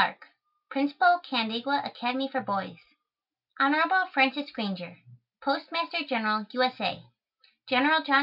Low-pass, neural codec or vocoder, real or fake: 5.4 kHz; none; real